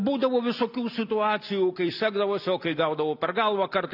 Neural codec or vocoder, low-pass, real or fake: none; 5.4 kHz; real